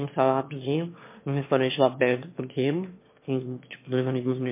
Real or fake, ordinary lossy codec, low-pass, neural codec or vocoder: fake; MP3, 24 kbps; 3.6 kHz; autoencoder, 22.05 kHz, a latent of 192 numbers a frame, VITS, trained on one speaker